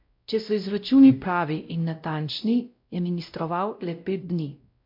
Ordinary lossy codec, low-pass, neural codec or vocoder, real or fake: MP3, 48 kbps; 5.4 kHz; codec, 16 kHz, 0.5 kbps, X-Codec, WavLM features, trained on Multilingual LibriSpeech; fake